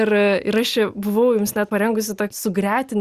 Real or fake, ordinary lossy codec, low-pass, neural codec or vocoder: real; Opus, 64 kbps; 14.4 kHz; none